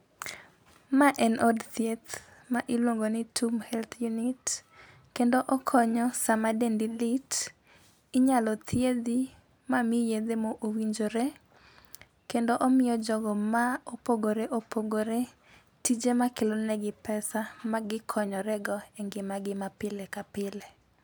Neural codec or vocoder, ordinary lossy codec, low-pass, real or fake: none; none; none; real